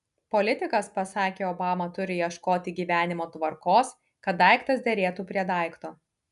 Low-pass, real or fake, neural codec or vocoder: 10.8 kHz; real; none